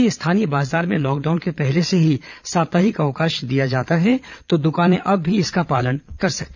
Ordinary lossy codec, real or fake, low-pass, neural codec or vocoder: none; fake; 7.2 kHz; vocoder, 22.05 kHz, 80 mel bands, Vocos